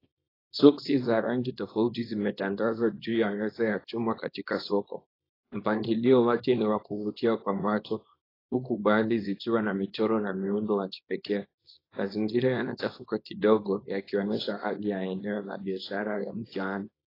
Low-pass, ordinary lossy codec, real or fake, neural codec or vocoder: 5.4 kHz; AAC, 24 kbps; fake; codec, 24 kHz, 0.9 kbps, WavTokenizer, small release